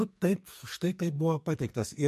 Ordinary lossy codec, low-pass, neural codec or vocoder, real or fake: MP3, 64 kbps; 14.4 kHz; codec, 44.1 kHz, 2.6 kbps, SNAC; fake